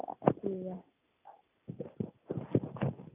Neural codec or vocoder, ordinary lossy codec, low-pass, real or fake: none; none; 3.6 kHz; real